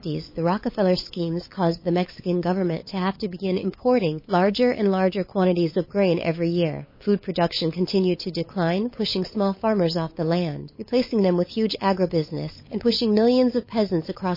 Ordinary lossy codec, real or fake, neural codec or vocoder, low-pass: MP3, 24 kbps; fake; codec, 16 kHz, 8 kbps, FunCodec, trained on LibriTTS, 25 frames a second; 5.4 kHz